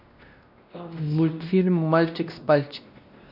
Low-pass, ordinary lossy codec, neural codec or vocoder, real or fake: 5.4 kHz; none; codec, 16 kHz, 1 kbps, X-Codec, WavLM features, trained on Multilingual LibriSpeech; fake